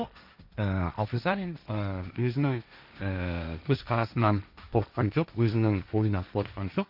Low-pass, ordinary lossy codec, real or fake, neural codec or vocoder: 5.4 kHz; none; fake; codec, 16 kHz, 1.1 kbps, Voila-Tokenizer